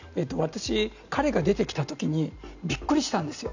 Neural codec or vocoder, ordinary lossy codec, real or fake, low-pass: none; none; real; 7.2 kHz